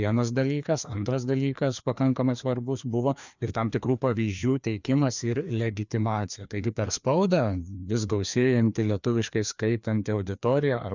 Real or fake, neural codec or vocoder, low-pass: fake; codec, 16 kHz, 2 kbps, FreqCodec, larger model; 7.2 kHz